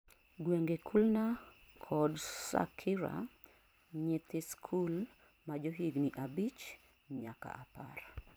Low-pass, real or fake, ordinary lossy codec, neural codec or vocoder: none; fake; none; vocoder, 44.1 kHz, 128 mel bands every 256 samples, BigVGAN v2